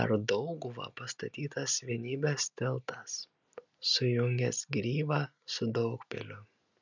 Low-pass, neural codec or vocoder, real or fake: 7.2 kHz; none; real